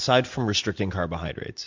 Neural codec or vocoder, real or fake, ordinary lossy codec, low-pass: none; real; MP3, 64 kbps; 7.2 kHz